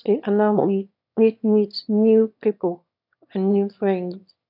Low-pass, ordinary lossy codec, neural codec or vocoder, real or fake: 5.4 kHz; none; autoencoder, 22.05 kHz, a latent of 192 numbers a frame, VITS, trained on one speaker; fake